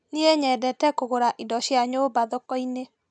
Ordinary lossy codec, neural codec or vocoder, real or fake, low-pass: none; none; real; none